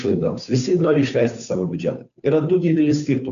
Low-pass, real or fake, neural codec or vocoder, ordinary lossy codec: 7.2 kHz; fake; codec, 16 kHz, 2 kbps, FunCodec, trained on Chinese and English, 25 frames a second; AAC, 96 kbps